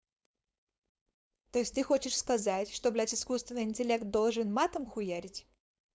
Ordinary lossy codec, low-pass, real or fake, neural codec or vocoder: none; none; fake; codec, 16 kHz, 4.8 kbps, FACodec